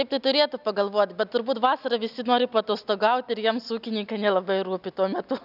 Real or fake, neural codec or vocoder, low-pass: real; none; 5.4 kHz